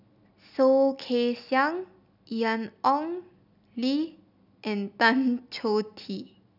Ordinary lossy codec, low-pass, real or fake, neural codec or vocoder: none; 5.4 kHz; real; none